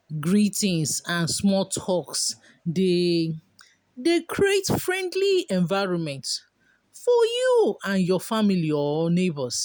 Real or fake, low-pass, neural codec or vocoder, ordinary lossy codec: real; none; none; none